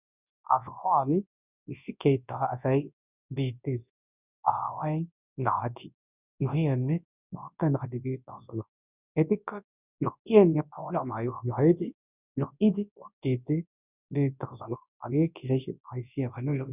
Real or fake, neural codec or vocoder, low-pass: fake; codec, 24 kHz, 0.9 kbps, WavTokenizer, large speech release; 3.6 kHz